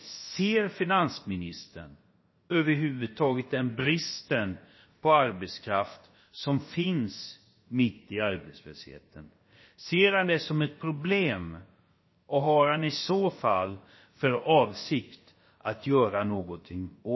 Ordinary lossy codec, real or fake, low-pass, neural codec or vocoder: MP3, 24 kbps; fake; 7.2 kHz; codec, 16 kHz, about 1 kbps, DyCAST, with the encoder's durations